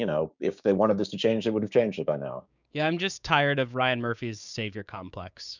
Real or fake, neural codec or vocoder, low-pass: fake; codec, 16 kHz, 6 kbps, DAC; 7.2 kHz